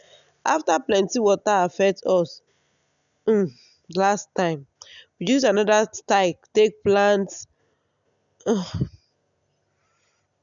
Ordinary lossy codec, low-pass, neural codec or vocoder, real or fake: none; 7.2 kHz; none; real